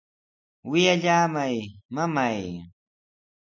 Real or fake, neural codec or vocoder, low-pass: real; none; 7.2 kHz